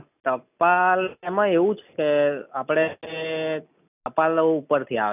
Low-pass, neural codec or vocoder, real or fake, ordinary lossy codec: 3.6 kHz; none; real; none